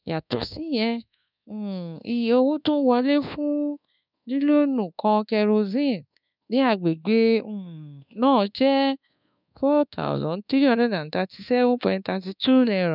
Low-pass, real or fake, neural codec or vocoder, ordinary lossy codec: 5.4 kHz; fake; codec, 24 kHz, 1.2 kbps, DualCodec; none